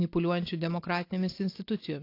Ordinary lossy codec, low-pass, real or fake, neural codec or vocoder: AAC, 32 kbps; 5.4 kHz; real; none